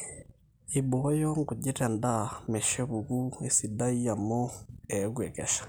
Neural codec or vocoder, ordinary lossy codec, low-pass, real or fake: none; none; none; real